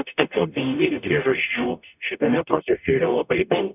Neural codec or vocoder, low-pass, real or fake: codec, 44.1 kHz, 0.9 kbps, DAC; 3.6 kHz; fake